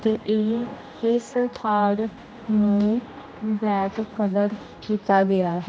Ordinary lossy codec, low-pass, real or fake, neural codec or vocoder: none; none; fake; codec, 16 kHz, 1 kbps, X-Codec, HuBERT features, trained on general audio